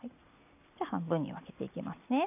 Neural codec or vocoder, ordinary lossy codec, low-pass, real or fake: none; none; 3.6 kHz; real